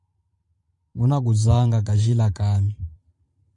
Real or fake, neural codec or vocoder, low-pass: fake; vocoder, 44.1 kHz, 128 mel bands every 512 samples, BigVGAN v2; 10.8 kHz